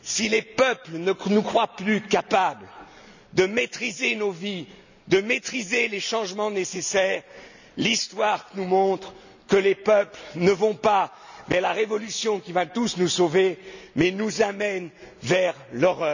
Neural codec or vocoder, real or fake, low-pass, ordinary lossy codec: none; real; 7.2 kHz; none